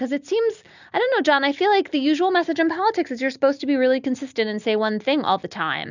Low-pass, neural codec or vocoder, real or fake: 7.2 kHz; none; real